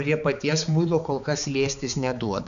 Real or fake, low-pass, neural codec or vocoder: fake; 7.2 kHz; codec, 16 kHz, 4 kbps, X-Codec, HuBERT features, trained on balanced general audio